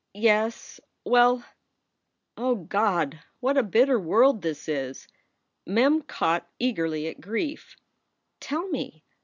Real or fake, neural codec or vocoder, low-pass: real; none; 7.2 kHz